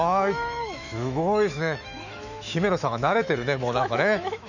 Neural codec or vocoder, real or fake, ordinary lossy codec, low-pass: autoencoder, 48 kHz, 128 numbers a frame, DAC-VAE, trained on Japanese speech; fake; none; 7.2 kHz